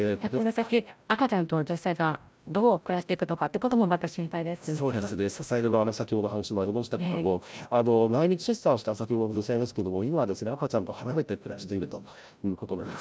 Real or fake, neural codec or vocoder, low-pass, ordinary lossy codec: fake; codec, 16 kHz, 0.5 kbps, FreqCodec, larger model; none; none